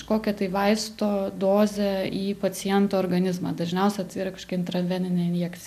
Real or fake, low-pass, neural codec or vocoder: real; 14.4 kHz; none